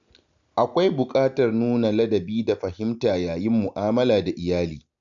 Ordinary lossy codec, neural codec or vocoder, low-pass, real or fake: none; none; 7.2 kHz; real